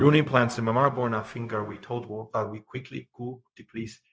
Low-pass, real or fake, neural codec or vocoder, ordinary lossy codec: none; fake; codec, 16 kHz, 0.4 kbps, LongCat-Audio-Codec; none